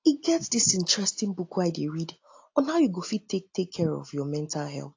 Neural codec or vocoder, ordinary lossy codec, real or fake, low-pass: none; AAC, 48 kbps; real; 7.2 kHz